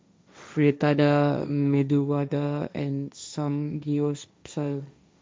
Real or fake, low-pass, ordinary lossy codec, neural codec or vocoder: fake; none; none; codec, 16 kHz, 1.1 kbps, Voila-Tokenizer